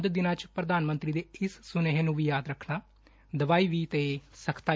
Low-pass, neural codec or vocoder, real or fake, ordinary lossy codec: none; none; real; none